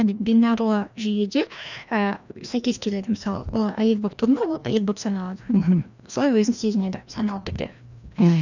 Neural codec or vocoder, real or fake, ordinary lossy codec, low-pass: codec, 16 kHz, 1 kbps, FreqCodec, larger model; fake; none; 7.2 kHz